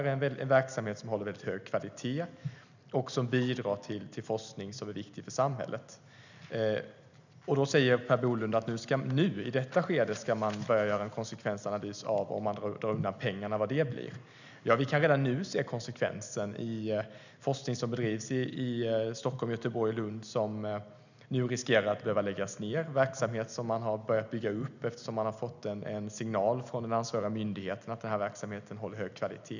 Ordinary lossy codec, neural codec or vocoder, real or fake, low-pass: none; none; real; 7.2 kHz